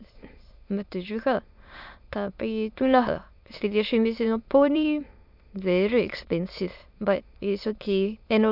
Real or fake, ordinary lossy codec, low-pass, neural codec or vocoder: fake; none; 5.4 kHz; autoencoder, 22.05 kHz, a latent of 192 numbers a frame, VITS, trained on many speakers